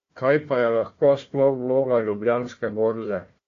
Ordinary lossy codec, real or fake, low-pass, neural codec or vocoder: AAC, 48 kbps; fake; 7.2 kHz; codec, 16 kHz, 1 kbps, FunCodec, trained on Chinese and English, 50 frames a second